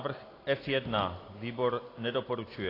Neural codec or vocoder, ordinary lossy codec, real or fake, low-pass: none; AAC, 24 kbps; real; 5.4 kHz